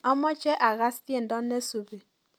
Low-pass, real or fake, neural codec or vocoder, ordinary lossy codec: none; real; none; none